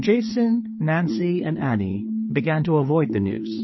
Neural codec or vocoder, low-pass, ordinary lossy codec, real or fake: codec, 16 kHz, 4 kbps, FreqCodec, larger model; 7.2 kHz; MP3, 24 kbps; fake